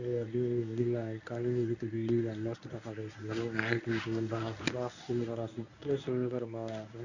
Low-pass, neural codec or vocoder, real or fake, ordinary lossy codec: 7.2 kHz; codec, 24 kHz, 0.9 kbps, WavTokenizer, medium speech release version 1; fake; none